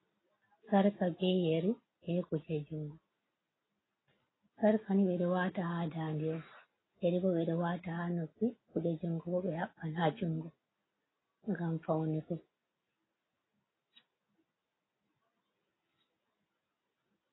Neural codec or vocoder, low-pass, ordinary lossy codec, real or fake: none; 7.2 kHz; AAC, 16 kbps; real